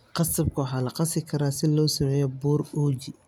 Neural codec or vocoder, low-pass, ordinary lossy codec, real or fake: none; 19.8 kHz; none; real